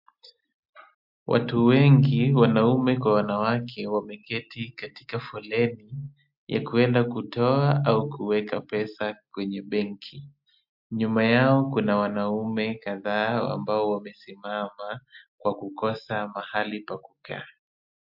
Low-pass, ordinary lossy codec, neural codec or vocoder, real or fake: 5.4 kHz; MP3, 48 kbps; none; real